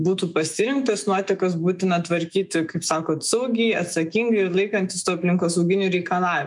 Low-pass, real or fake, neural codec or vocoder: 10.8 kHz; fake; autoencoder, 48 kHz, 128 numbers a frame, DAC-VAE, trained on Japanese speech